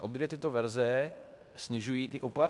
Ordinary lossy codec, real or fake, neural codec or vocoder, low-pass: AAC, 64 kbps; fake; codec, 16 kHz in and 24 kHz out, 0.9 kbps, LongCat-Audio-Codec, fine tuned four codebook decoder; 10.8 kHz